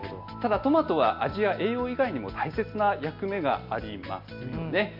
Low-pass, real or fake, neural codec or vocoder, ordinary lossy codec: 5.4 kHz; real; none; MP3, 48 kbps